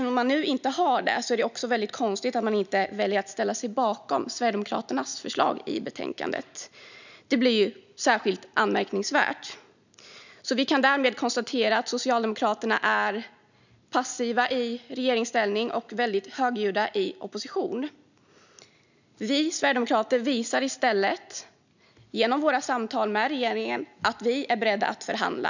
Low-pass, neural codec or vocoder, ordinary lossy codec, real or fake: 7.2 kHz; none; none; real